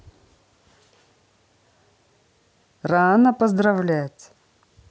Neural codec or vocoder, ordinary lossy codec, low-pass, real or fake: none; none; none; real